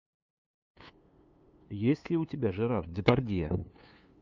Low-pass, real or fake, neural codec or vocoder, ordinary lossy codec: 7.2 kHz; fake; codec, 16 kHz, 2 kbps, FunCodec, trained on LibriTTS, 25 frames a second; MP3, 64 kbps